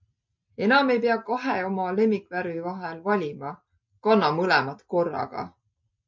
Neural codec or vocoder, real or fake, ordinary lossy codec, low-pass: none; real; MP3, 48 kbps; 7.2 kHz